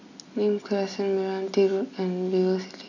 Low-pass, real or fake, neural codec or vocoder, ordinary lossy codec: 7.2 kHz; real; none; none